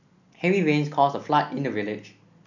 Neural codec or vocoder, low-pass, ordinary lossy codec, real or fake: none; 7.2 kHz; none; real